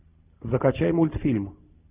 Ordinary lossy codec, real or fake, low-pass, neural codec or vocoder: Opus, 64 kbps; real; 3.6 kHz; none